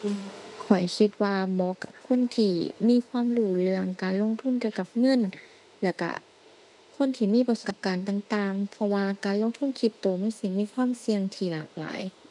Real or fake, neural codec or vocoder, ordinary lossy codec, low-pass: fake; autoencoder, 48 kHz, 32 numbers a frame, DAC-VAE, trained on Japanese speech; none; 10.8 kHz